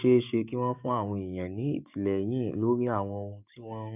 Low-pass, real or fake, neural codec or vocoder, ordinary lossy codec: 3.6 kHz; fake; codec, 16 kHz, 6 kbps, DAC; none